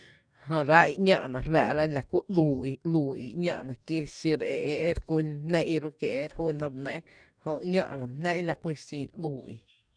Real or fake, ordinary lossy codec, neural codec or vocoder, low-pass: fake; none; codec, 44.1 kHz, 2.6 kbps, DAC; 9.9 kHz